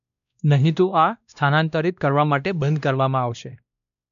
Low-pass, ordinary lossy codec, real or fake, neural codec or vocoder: 7.2 kHz; none; fake; codec, 16 kHz, 1 kbps, X-Codec, WavLM features, trained on Multilingual LibriSpeech